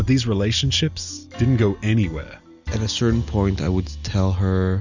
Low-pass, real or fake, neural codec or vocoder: 7.2 kHz; real; none